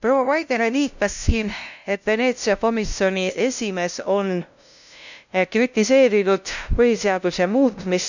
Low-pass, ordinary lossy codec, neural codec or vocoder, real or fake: 7.2 kHz; none; codec, 16 kHz, 0.5 kbps, FunCodec, trained on LibriTTS, 25 frames a second; fake